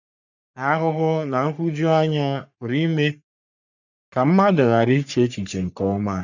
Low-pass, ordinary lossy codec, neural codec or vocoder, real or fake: 7.2 kHz; none; codec, 44.1 kHz, 3.4 kbps, Pupu-Codec; fake